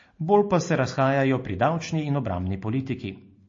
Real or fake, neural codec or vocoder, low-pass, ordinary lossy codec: real; none; 7.2 kHz; MP3, 32 kbps